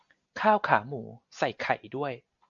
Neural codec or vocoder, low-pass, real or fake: none; 7.2 kHz; real